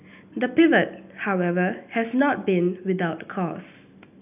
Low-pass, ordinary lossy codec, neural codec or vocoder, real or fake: 3.6 kHz; none; none; real